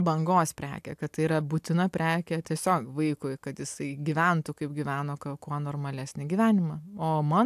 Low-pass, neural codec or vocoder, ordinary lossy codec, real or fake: 14.4 kHz; none; AAC, 96 kbps; real